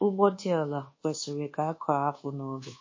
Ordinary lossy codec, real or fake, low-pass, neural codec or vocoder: MP3, 32 kbps; fake; 7.2 kHz; codec, 24 kHz, 1.2 kbps, DualCodec